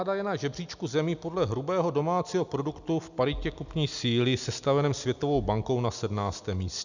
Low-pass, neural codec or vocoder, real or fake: 7.2 kHz; none; real